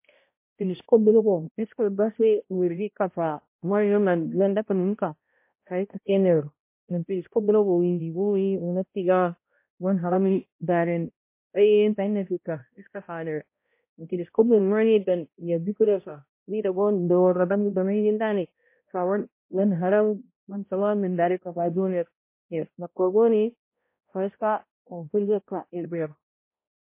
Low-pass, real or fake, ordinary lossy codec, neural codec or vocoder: 3.6 kHz; fake; MP3, 24 kbps; codec, 16 kHz, 0.5 kbps, X-Codec, HuBERT features, trained on balanced general audio